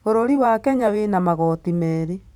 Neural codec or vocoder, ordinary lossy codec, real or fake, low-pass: vocoder, 44.1 kHz, 128 mel bands every 512 samples, BigVGAN v2; none; fake; 19.8 kHz